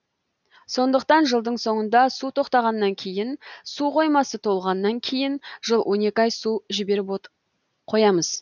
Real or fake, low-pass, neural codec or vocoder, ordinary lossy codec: real; 7.2 kHz; none; none